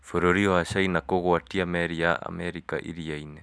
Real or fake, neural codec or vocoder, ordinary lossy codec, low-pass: real; none; none; none